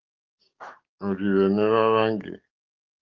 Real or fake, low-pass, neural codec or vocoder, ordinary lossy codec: fake; 7.2 kHz; codec, 44.1 kHz, 7.8 kbps, DAC; Opus, 24 kbps